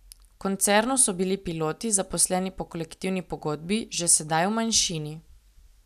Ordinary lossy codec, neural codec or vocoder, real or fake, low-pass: none; none; real; 14.4 kHz